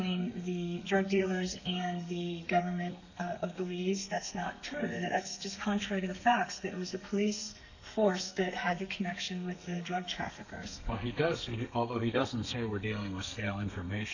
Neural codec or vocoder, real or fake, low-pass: codec, 32 kHz, 1.9 kbps, SNAC; fake; 7.2 kHz